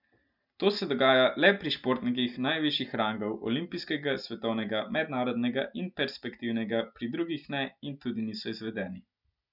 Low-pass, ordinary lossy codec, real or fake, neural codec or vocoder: 5.4 kHz; none; real; none